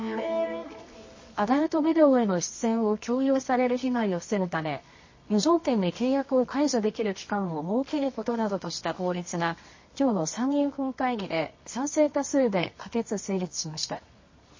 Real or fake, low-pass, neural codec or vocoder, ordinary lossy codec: fake; 7.2 kHz; codec, 24 kHz, 0.9 kbps, WavTokenizer, medium music audio release; MP3, 32 kbps